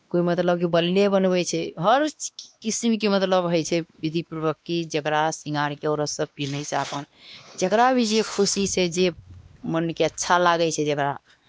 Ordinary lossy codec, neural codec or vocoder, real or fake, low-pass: none; codec, 16 kHz, 2 kbps, X-Codec, WavLM features, trained on Multilingual LibriSpeech; fake; none